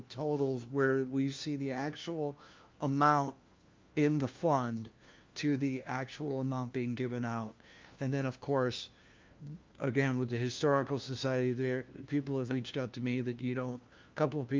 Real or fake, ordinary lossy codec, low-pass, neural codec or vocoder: fake; Opus, 24 kbps; 7.2 kHz; codec, 16 kHz, 1 kbps, FunCodec, trained on LibriTTS, 50 frames a second